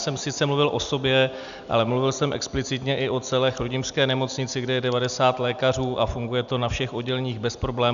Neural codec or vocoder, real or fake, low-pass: none; real; 7.2 kHz